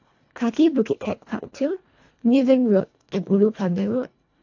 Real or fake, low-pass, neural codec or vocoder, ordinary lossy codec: fake; 7.2 kHz; codec, 24 kHz, 1.5 kbps, HILCodec; AAC, 48 kbps